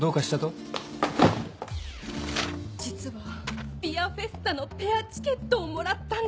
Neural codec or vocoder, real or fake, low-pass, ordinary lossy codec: none; real; none; none